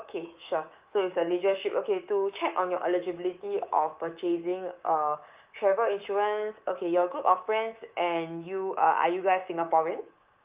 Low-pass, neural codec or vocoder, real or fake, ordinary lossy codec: 3.6 kHz; codec, 24 kHz, 3.1 kbps, DualCodec; fake; Opus, 24 kbps